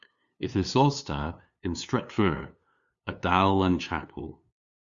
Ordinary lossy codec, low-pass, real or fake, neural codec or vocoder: Opus, 64 kbps; 7.2 kHz; fake; codec, 16 kHz, 2 kbps, FunCodec, trained on LibriTTS, 25 frames a second